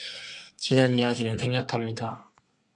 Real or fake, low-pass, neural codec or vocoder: fake; 10.8 kHz; codec, 24 kHz, 1 kbps, SNAC